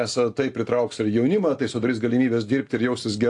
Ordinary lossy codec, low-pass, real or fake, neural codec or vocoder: AAC, 48 kbps; 10.8 kHz; real; none